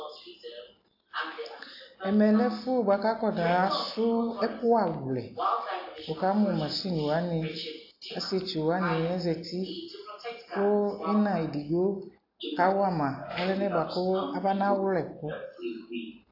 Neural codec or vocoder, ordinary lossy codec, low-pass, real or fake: none; AAC, 32 kbps; 5.4 kHz; real